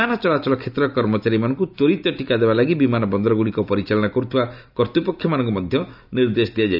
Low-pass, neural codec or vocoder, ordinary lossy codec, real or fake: 5.4 kHz; none; none; real